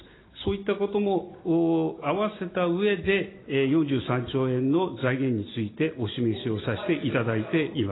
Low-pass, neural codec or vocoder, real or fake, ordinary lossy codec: 7.2 kHz; none; real; AAC, 16 kbps